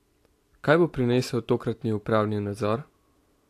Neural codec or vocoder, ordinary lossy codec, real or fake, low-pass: none; AAC, 64 kbps; real; 14.4 kHz